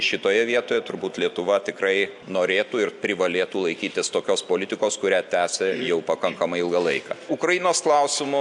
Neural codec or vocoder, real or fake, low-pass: none; real; 10.8 kHz